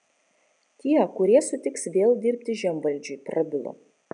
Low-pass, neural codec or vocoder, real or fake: 9.9 kHz; none; real